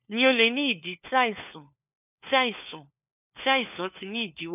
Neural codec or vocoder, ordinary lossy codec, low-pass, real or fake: codec, 16 kHz, 4 kbps, FunCodec, trained on LibriTTS, 50 frames a second; none; 3.6 kHz; fake